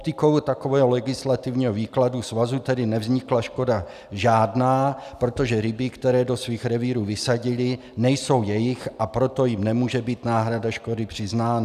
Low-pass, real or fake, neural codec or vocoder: 14.4 kHz; real; none